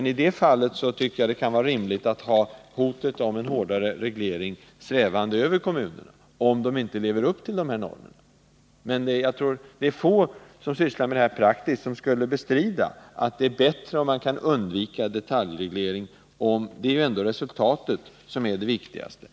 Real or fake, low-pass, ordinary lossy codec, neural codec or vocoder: real; none; none; none